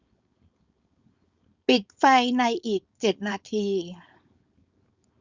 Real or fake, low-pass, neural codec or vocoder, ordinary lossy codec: fake; 7.2 kHz; codec, 16 kHz, 4.8 kbps, FACodec; Opus, 64 kbps